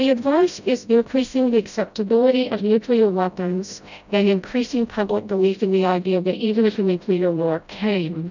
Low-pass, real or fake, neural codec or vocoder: 7.2 kHz; fake; codec, 16 kHz, 0.5 kbps, FreqCodec, smaller model